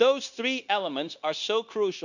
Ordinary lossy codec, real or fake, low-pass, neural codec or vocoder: none; fake; 7.2 kHz; codec, 16 kHz, 0.9 kbps, LongCat-Audio-Codec